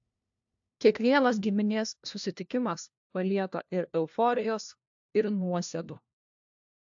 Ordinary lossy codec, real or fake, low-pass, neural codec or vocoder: AAC, 64 kbps; fake; 7.2 kHz; codec, 16 kHz, 1 kbps, FunCodec, trained on LibriTTS, 50 frames a second